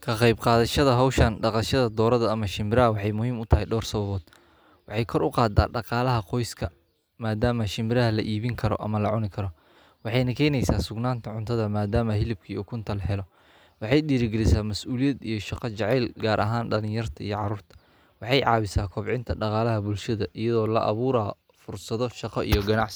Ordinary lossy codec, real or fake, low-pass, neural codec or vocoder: none; real; none; none